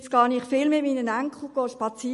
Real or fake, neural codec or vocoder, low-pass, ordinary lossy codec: real; none; 14.4 kHz; MP3, 48 kbps